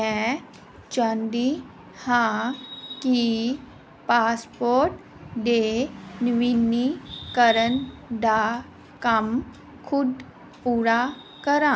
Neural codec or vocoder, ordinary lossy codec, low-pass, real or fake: none; none; none; real